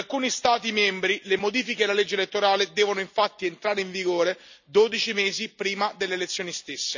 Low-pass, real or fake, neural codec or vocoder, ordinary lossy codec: 7.2 kHz; real; none; none